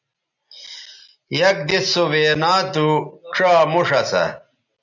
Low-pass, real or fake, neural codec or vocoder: 7.2 kHz; real; none